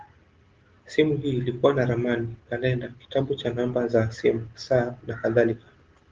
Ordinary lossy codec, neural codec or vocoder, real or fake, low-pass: Opus, 16 kbps; none; real; 7.2 kHz